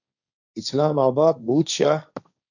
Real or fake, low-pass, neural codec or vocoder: fake; 7.2 kHz; codec, 16 kHz, 1.1 kbps, Voila-Tokenizer